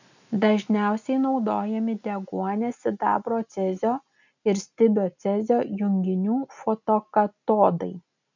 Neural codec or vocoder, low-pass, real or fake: none; 7.2 kHz; real